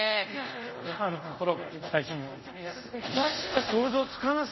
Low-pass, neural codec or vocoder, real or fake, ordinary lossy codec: 7.2 kHz; codec, 24 kHz, 0.5 kbps, DualCodec; fake; MP3, 24 kbps